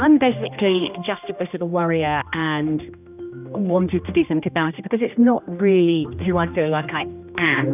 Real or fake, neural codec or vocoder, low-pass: fake; codec, 16 kHz, 1 kbps, X-Codec, HuBERT features, trained on general audio; 3.6 kHz